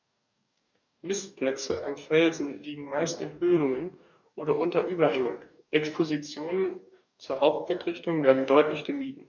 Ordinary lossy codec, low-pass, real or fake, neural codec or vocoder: none; 7.2 kHz; fake; codec, 44.1 kHz, 2.6 kbps, DAC